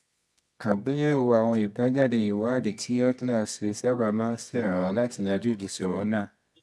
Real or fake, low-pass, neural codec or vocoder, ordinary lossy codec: fake; none; codec, 24 kHz, 0.9 kbps, WavTokenizer, medium music audio release; none